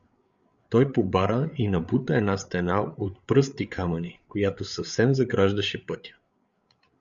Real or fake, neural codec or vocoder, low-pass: fake; codec, 16 kHz, 8 kbps, FreqCodec, larger model; 7.2 kHz